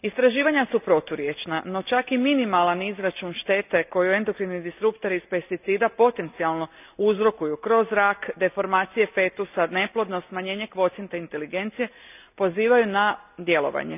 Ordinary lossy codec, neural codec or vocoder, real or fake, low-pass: none; none; real; 3.6 kHz